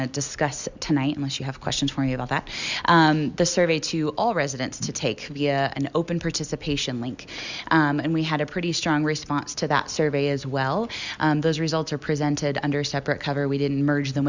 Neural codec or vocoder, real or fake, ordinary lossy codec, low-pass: none; real; Opus, 64 kbps; 7.2 kHz